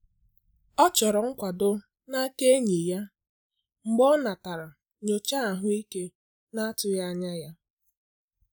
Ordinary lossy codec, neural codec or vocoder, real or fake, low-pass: none; none; real; none